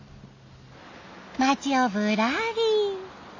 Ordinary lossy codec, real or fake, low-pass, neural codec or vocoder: AAC, 48 kbps; real; 7.2 kHz; none